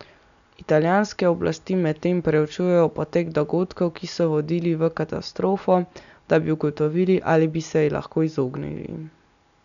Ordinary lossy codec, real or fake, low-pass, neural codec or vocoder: none; real; 7.2 kHz; none